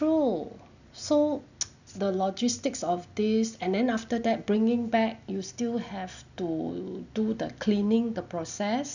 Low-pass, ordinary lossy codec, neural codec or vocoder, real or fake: 7.2 kHz; none; none; real